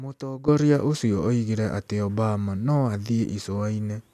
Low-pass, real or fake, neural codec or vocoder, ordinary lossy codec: 14.4 kHz; real; none; none